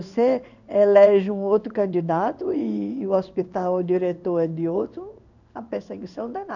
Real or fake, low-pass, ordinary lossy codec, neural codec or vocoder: fake; 7.2 kHz; none; codec, 16 kHz in and 24 kHz out, 1 kbps, XY-Tokenizer